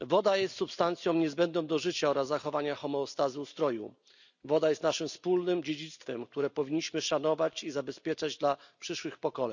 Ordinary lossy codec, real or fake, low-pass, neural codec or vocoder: none; real; 7.2 kHz; none